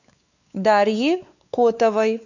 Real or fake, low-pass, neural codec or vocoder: fake; 7.2 kHz; codec, 16 kHz, 4 kbps, X-Codec, WavLM features, trained on Multilingual LibriSpeech